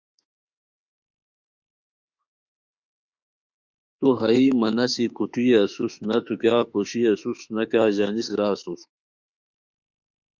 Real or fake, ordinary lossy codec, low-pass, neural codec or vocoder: fake; Opus, 64 kbps; 7.2 kHz; autoencoder, 48 kHz, 32 numbers a frame, DAC-VAE, trained on Japanese speech